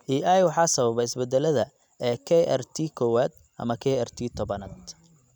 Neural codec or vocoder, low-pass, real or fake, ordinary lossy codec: none; 19.8 kHz; real; none